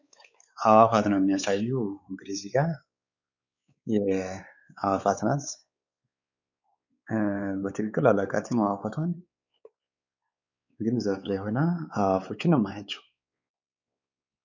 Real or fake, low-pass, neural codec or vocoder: fake; 7.2 kHz; codec, 16 kHz, 4 kbps, X-Codec, WavLM features, trained on Multilingual LibriSpeech